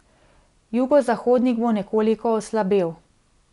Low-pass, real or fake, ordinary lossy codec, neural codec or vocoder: 10.8 kHz; real; none; none